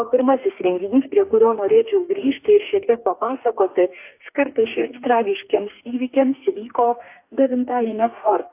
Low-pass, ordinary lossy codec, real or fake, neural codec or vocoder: 3.6 kHz; AAC, 32 kbps; fake; codec, 44.1 kHz, 2.6 kbps, DAC